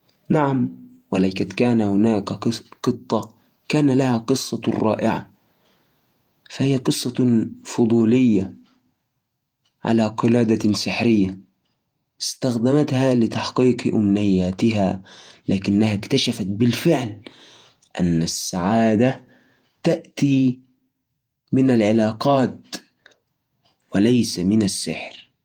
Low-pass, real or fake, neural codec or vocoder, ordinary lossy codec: 19.8 kHz; fake; vocoder, 48 kHz, 128 mel bands, Vocos; Opus, 32 kbps